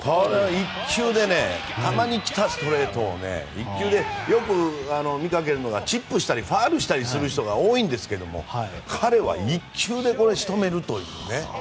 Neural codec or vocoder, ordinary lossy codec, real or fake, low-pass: none; none; real; none